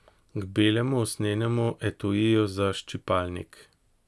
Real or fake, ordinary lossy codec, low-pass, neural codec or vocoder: real; none; none; none